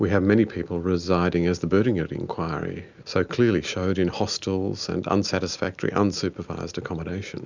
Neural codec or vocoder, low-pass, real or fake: none; 7.2 kHz; real